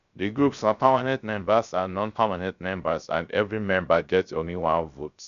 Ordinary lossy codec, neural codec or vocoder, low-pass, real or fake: none; codec, 16 kHz, 0.3 kbps, FocalCodec; 7.2 kHz; fake